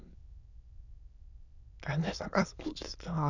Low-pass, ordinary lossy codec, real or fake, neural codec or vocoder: 7.2 kHz; none; fake; autoencoder, 22.05 kHz, a latent of 192 numbers a frame, VITS, trained on many speakers